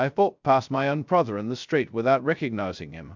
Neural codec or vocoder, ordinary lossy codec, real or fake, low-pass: codec, 16 kHz, 0.2 kbps, FocalCodec; MP3, 64 kbps; fake; 7.2 kHz